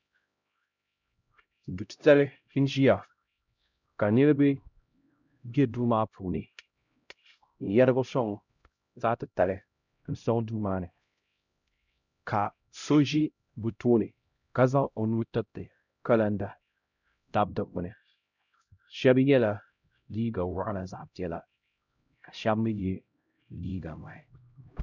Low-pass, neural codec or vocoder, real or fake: 7.2 kHz; codec, 16 kHz, 0.5 kbps, X-Codec, HuBERT features, trained on LibriSpeech; fake